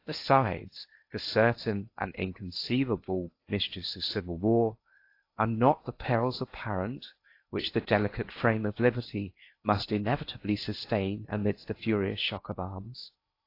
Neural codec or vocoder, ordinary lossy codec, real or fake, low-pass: codec, 16 kHz in and 24 kHz out, 0.8 kbps, FocalCodec, streaming, 65536 codes; AAC, 32 kbps; fake; 5.4 kHz